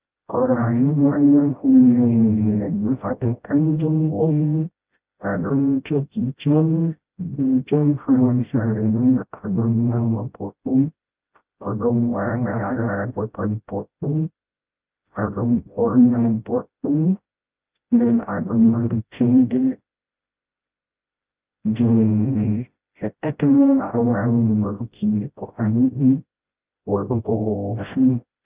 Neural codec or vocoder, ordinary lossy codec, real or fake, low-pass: codec, 16 kHz, 0.5 kbps, FreqCodec, smaller model; Opus, 24 kbps; fake; 3.6 kHz